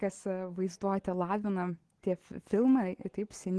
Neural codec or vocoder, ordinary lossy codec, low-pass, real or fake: none; Opus, 16 kbps; 10.8 kHz; real